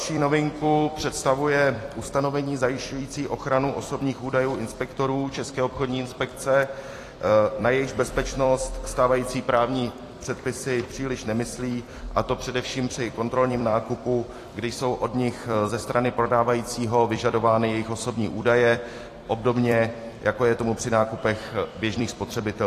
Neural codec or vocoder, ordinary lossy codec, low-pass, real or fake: vocoder, 44.1 kHz, 128 mel bands every 256 samples, BigVGAN v2; AAC, 48 kbps; 14.4 kHz; fake